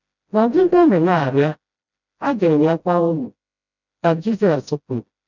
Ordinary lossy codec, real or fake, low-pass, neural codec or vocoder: none; fake; 7.2 kHz; codec, 16 kHz, 0.5 kbps, FreqCodec, smaller model